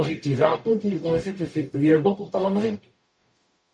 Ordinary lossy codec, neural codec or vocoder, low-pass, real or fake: MP3, 48 kbps; codec, 44.1 kHz, 0.9 kbps, DAC; 9.9 kHz; fake